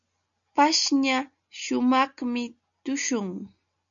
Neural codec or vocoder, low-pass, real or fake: none; 7.2 kHz; real